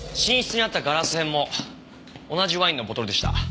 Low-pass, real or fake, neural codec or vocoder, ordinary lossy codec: none; real; none; none